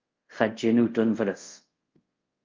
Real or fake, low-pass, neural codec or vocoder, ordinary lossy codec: fake; 7.2 kHz; codec, 24 kHz, 0.5 kbps, DualCodec; Opus, 24 kbps